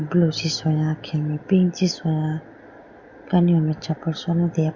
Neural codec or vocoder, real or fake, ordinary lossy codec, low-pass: none; real; Opus, 64 kbps; 7.2 kHz